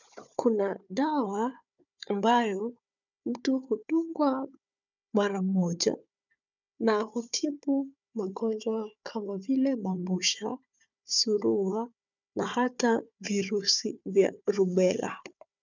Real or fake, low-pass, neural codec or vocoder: fake; 7.2 kHz; codec, 16 kHz, 4 kbps, FunCodec, trained on Chinese and English, 50 frames a second